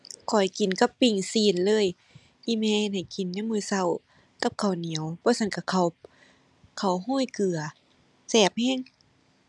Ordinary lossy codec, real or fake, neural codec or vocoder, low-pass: none; real; none; none